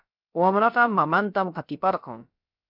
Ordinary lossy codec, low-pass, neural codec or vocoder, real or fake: MP3, 48 kbps; 5.4 kHz; codec, 16 kHz, about 1 kbps, DyCAST, with the encoder's durations; fake